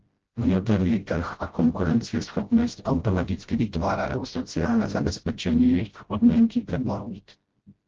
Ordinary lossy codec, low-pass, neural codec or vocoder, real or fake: Opus, 16 kbps; 7.2 kHz; codec, 16 kHz, 0.5 kbps, FreqCodec, smaller model; fake